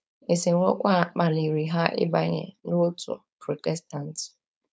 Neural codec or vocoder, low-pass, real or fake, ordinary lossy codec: codec, 16 kHz, 4.8 kbps, FACodec; none; fake; none